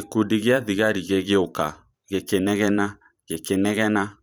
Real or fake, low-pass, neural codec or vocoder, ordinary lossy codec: fake; none; vocoder, 44.1 kHz, 128 mel bands every 512 samples, BigVGAN v2; none